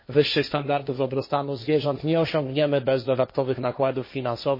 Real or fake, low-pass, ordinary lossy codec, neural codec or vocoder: fake; 5.4 kHz; MP3, 24 kbps; codec, 16 kHz, 1.1 kbps, Voila-Tokenizer